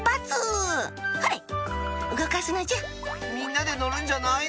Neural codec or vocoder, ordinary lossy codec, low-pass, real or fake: none; none; none; real